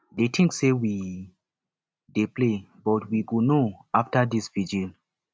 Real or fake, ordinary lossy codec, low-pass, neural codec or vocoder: real; none; none; none